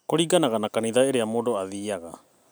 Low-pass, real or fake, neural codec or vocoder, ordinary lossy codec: none; real; none; none